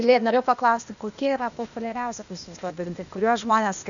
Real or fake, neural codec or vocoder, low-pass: fake; codec, 16 kHz, 0.8 kbps, ZipCodec; 7.2 kHz